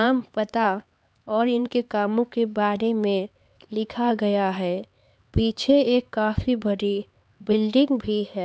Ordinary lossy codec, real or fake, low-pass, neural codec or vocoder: none; fake; none; codec, 16 kHz, 4 kbps, X-Codec, HuBERT features, trained on LibriSpeech